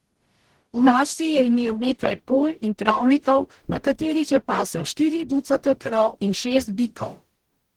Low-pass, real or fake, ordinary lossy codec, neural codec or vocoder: 19.8 kHz; fake; Opus, 16 kbps; codec, 44.1 kHz, 0.9 kbps, DAC